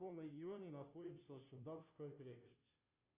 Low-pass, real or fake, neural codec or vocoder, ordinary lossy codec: 3.6 kHz; fake; codec, 16 kHz, 0.5 kbps, FunCodec, trained on Chinese and English, 25 frames a second; Opus, 64 kbps